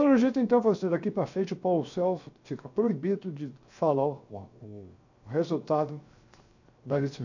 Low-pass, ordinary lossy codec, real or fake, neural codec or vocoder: 7.2 kHz; none; fake; codec, 16 kHz, 0.7 kbps, FocalCodec